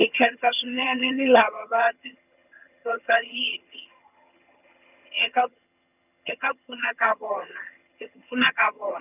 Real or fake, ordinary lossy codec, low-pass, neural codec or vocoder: fake; none; 3.6 kHz; vocoder, 22.05 kHz, 80 mel bands, HiFi-GAN